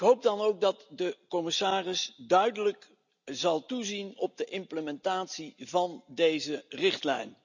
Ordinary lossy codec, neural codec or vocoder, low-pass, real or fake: none; none; 7.2 kHz; real